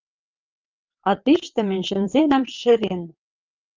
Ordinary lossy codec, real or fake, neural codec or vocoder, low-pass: Opus, 24 kbps; fake; vocoder, 22.05 kHz, 80 mel bands, WaveNeXt; 7.2 kHz